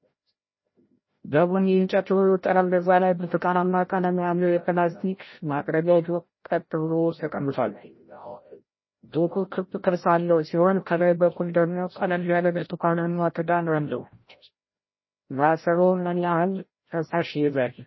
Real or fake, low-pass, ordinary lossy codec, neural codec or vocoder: fake; 7.2 kHz; MP3, 24 kbps; codec, 16 kHz, 0.5 kbps, FreqCodec, larger model